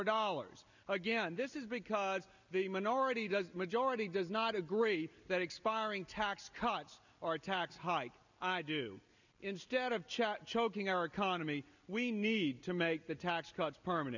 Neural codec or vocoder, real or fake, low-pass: none; real; 7.2 kHz